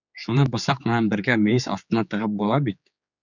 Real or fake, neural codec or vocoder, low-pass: fake; codec, 16 kHz, 4 kbps, X-Codec, HuBERT features, trained on general audio; 7.2 kHz